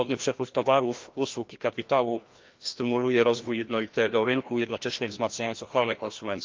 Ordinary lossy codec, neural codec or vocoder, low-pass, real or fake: Opus, 32 kbps; codec, 16 kHz, 1 kbps, FreqCodec, larger model; 7.2 kHz; fake